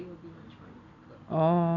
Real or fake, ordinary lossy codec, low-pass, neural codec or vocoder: real; none; 7.2 kHz; none